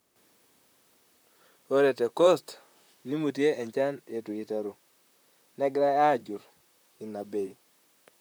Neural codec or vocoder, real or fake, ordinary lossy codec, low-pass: vocoder, 44.1 kHz, 128 mel bands, Pupu-Vocoder; fake; none; none